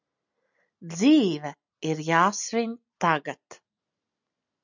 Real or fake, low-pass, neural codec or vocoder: real; 7.2 kHz; none